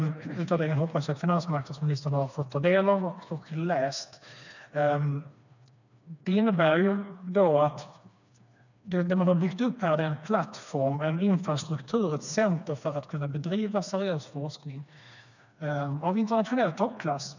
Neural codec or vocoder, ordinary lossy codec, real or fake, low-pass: codec, 16 kHz, 2 kbps, FreqCodec, smaller model; none; fake; 7.2 kHz